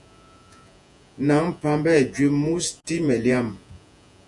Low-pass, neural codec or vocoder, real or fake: 10.8 kHz; vocoder, 48 kHz, 128 mel bands, Vocos; fake